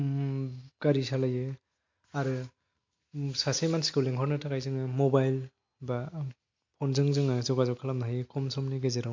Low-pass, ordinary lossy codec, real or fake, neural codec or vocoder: 7.2 kHz; MP3, 48 kbps; real; none